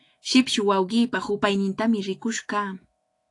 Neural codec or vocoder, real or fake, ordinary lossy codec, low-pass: autoencoder, 48 kHz, 128 numbers a frame, DAC-VAE, trained on Japanese speech; fake; AAC, 48 kbps; 10.8 kHz